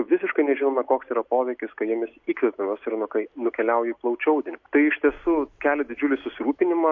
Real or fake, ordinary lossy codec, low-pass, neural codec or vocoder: real; MP3, 48 kbps; 7.2 kHz; none